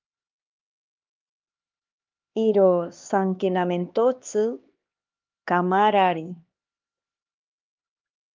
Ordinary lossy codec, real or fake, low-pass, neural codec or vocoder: Opus, 24 kbps; fake; 7.2 kHz; codec, 16 kHz, 2 kbps, X-Codec, HuBERT features, trained on LibriSpeech